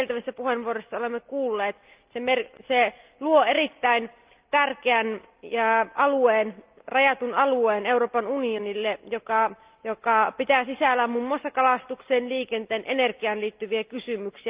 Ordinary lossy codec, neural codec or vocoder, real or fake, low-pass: Opus, 32 kbps; none; real; 3.6 kHz